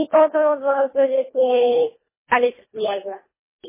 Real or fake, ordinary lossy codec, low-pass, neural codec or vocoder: fake; MP3, 16 kbps; 3.6 kHz; codec, 24 kHz, 1.5 kbps, HILCodec